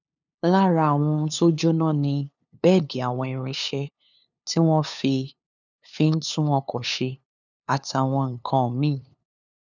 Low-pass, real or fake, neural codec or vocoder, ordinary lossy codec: 7.2 kHz; fake; codec, 16 kHz, 2 kbps, FunCodec, trained on LibriTTS, 25 frames a second; none